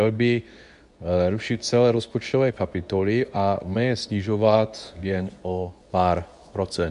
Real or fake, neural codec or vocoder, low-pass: fake; codec, 24 kHz, 0.9 kbps, WavTokenizer, medium speech release version 2; 10.8 kHz